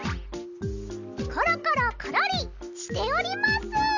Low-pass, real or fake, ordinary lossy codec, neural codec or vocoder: 7.2 kHz; real; none; none